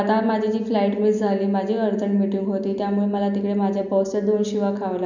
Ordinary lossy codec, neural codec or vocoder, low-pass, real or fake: none; none; 7.2 kHz; real